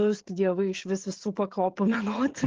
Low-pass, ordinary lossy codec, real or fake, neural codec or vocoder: 7.2 kHz; Opus, 16 kbps; fake; codec, 16 kHz, 16 kbps, FreqCodec, smaller model